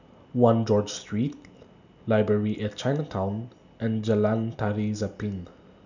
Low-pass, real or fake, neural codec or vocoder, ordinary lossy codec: 7.2 kHz; real; none; none